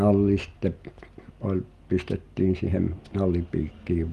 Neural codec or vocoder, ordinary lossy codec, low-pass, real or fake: none; Opus, 32 kbps; 14.4 kHz; real